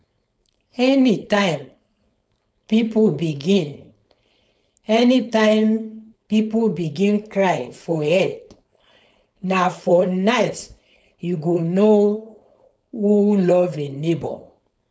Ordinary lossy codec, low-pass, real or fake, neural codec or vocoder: none; none; fake; codec, 16 kHz, 4.8 kbps, FACodec